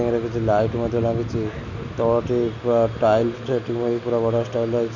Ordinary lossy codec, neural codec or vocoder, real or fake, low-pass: none; none; real; 7.2 kHz